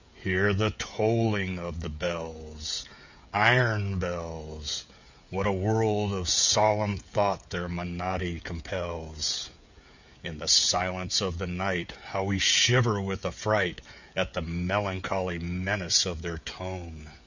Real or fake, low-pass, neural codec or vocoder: fake; 7.2 kHz; codec, 16 kHz, 16 kbps, FreqCodec, smaller model